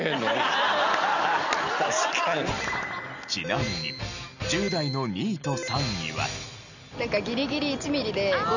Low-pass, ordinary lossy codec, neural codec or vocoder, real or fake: 7.2 kHz; none; none; real